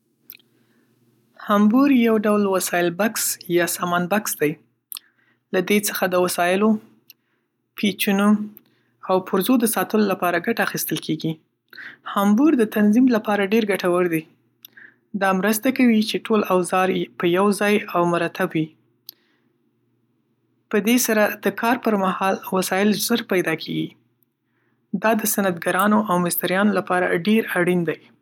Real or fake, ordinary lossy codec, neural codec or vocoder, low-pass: real; none; none; 19.8 kHz